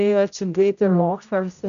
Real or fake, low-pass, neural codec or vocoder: fake; 7.2 kHz; codec, 16 kHz, 0.5 kbps, X-Codec, HuBERT features, trained on general audio